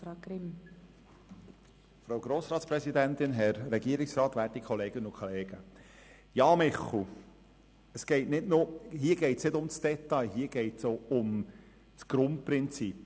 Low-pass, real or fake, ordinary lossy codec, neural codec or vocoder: none; real; none; none